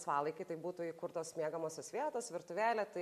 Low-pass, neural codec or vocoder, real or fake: 14.4 kHz; none; real